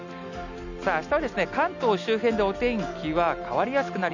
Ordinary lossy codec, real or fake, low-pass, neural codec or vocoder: none; real; 7.2 kHz; none